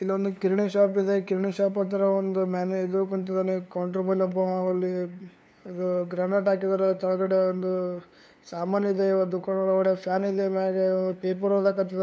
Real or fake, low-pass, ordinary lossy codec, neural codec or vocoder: fake; none; none; codec, 16 kHz, 2 kbps, FunCodec, trained on LibriTTS, 25 frames a second